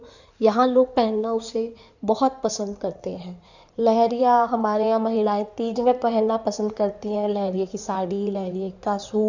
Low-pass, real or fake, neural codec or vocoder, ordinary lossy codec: 7.2 kHz; fake; codec, 16 kHz in and 24 kHz out, 2.2 kbps, FireRedTTS-2 codec; none